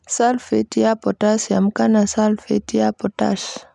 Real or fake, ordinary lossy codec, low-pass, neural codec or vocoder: real; none; 10.8 kHz; none